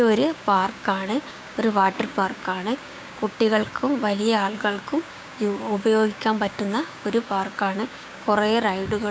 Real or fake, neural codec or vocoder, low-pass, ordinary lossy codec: fake; codec, 16 kHz, 6 kbps, DAC; none; none